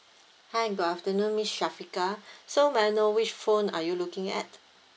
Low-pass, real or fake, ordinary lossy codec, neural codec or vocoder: none; real; none; none